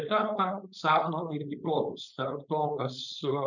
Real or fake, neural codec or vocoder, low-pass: fake; codec, 16 kHz, 4.8 kbps, FACodec; 7.2 kHz